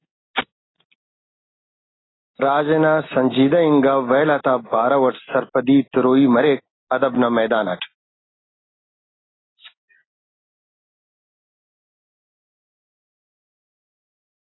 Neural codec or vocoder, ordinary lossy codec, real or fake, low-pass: none; AAC, 16 kbps; real; 7.2 kHz